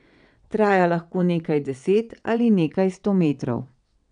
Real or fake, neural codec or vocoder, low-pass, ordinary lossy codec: fake; vocoder, 22.05 kHz, 80 mel bands, WaveNeXt; 9.9 kHz; none